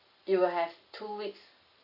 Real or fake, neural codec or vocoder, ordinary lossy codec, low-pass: real; none; AAC, 48 kbps; 5.4 kHz